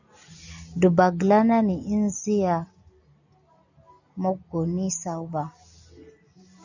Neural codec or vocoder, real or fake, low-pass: none; real; 7.2 kHz